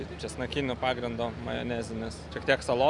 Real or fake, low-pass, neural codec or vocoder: real; 10.8 kHz; none